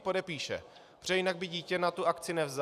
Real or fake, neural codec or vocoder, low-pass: fake; vocoder, 44.1 kHz, 128 mel bands every 256 samples, BigVGAN v2; 14.4 kHz